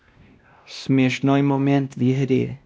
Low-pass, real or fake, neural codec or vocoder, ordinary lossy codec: none; fake; codec, 16 kHz, 1 kbps, X-Codec, WavLM features, trained on Multilingual LibriSpeech; none